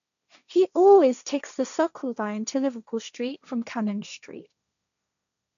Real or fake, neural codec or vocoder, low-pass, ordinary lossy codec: fake; codec, 16 kHz, 1.1 kbps, Voila-Tokenizer; 7.2 kHz; none